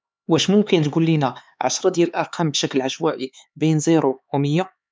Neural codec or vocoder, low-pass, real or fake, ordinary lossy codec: codec, 16 kHz, 4 kbps, X-Codec, HuBERT features, trained on LibriSpeech; none; fake; none